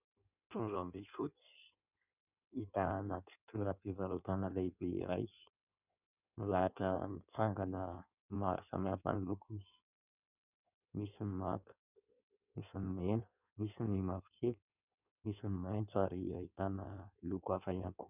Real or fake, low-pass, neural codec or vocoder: fake; 3.6 kHz; codec, 16 kHz in and 24 kHz out, 1.1 kbps, FireRedTTS-2 codec